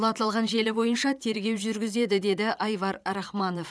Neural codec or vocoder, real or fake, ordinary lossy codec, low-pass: vocoder, 22.05 kHz, 80 mel bands, Vocos; fake; none; none